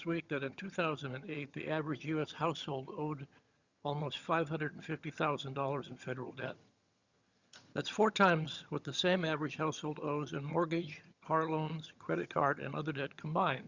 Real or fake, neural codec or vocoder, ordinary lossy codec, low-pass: fake; vocoder, 22.05 kHz, 80 mel bands, HiFi-GAN; Opus, 64 kbps; 7.2 kHz